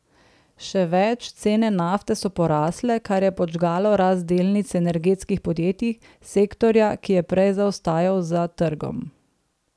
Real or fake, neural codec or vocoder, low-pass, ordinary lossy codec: real; none; none; none